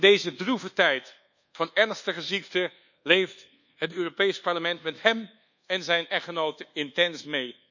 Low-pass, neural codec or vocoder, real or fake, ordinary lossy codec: 7.2 kHz; codec, 24 kHz, 1.2 kbps, DualCodec; fake; none